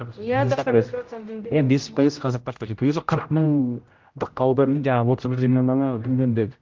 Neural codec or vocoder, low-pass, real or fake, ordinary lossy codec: codec, 16 kHz, 0.5 kbps, X-Codec, HuBERT features, trained on general audio; 7.2 kHz; fake; Opus, 32 kbps